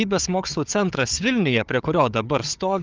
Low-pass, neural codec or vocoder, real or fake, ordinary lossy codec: 7.2 kHz; codec, 16 kHz, 4 kbps, FunCodec, trained on Chinese and English, 50 frames a second; fake; Opus, 24 kbps